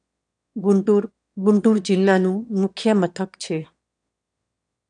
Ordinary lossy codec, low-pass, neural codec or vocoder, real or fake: none; 9.9 kHz; autoencoder, 22.05 kHz, a latent of 192 numbers a frame, VITS, trained on one speaker; fake